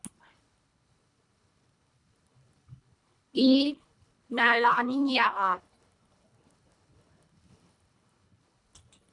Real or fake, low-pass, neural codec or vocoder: fake; 10.8 kHz; codec, 24 kHz, 1.5 kbps, HILCodec